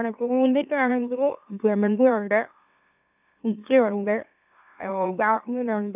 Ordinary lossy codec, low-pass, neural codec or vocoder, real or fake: none; 3.6 kHz; autoencoder, 44.1 kHz, a latent of 192 numbers a frame, MeloTTS; fake